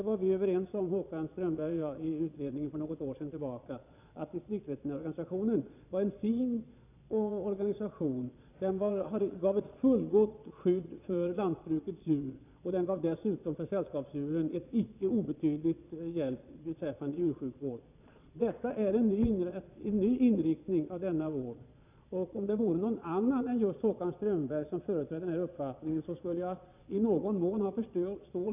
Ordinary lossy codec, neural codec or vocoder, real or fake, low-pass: none; vocoder, 22.05 kHz, 80 mel bands, Vocos; fake; 3.6 kHz